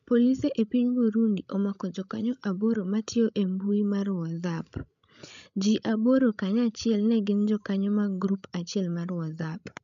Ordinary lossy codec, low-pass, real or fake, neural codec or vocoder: none; 7.2 kHz; fake; codec, 16 kHz, 16 kbps, FreqCodec, larger model